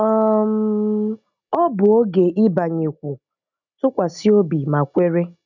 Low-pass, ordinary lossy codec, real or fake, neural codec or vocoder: 7.2 kHz; none; real; none